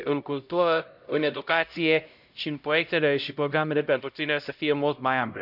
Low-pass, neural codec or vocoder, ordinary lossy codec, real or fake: 5.4 kHz; codec, 16 kHz, 0.5 kbps, X-Codec, HuBERT features, trained on LibriSpeech; none; fake